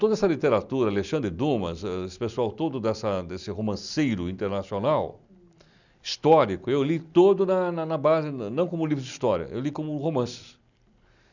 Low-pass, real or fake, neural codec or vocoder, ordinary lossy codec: 7.2 kHz; real; none; none